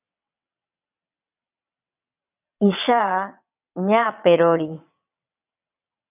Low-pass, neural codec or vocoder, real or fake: 3.6 kHz; vocoder, 22.05 kHz, 80 mel bands, WaveNeXt; fake